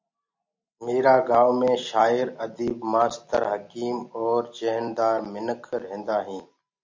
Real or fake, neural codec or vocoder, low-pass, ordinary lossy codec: real; none; 7.2 kHz; MP3, 64 kbps